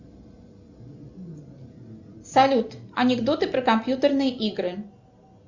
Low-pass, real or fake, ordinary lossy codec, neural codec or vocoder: 7.2 kHz; real; Opus, 64 kbps; none